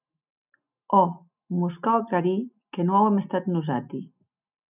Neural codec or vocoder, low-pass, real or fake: none; 3.6 kHz; real